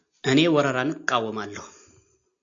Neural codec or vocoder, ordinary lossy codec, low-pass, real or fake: none; AAC, 64 kbps; 7.2 kHz; real